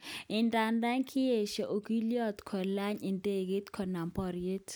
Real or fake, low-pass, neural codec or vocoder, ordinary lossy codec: real; none; none; none